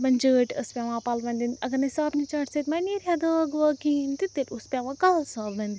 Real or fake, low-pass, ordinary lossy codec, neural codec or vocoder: real; none; none; none